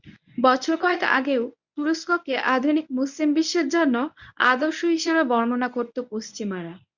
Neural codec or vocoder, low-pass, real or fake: codec, 24 kHz, 0.9 kbps, WavTokenizer, medium speech release version 1; 7.2 kHz; fake